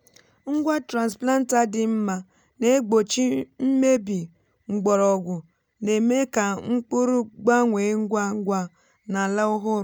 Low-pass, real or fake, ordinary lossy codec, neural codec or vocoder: none; real; none; none